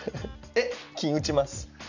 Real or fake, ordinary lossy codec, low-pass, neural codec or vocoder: real; none; 7.2 kHz; none